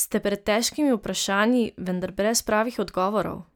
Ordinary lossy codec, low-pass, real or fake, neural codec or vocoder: none; none; real; none